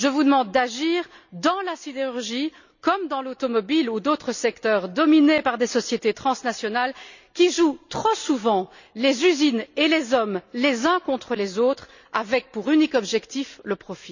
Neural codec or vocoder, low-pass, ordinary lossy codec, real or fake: none; 7.2 kHz; none; real